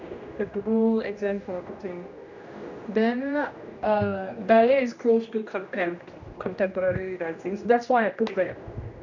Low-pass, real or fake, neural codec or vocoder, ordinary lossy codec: 7.2 kHz; fake; codec, 16 kHz, 1 kbps, X-Codec, HuBERT features, trained on general audio; none